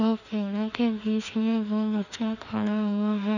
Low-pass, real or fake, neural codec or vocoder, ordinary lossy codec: 7.2 kHz; fake; autoencoder, 48 kHz, 32 numbers a frame, DAC-VAE, trained on Japanese speech; none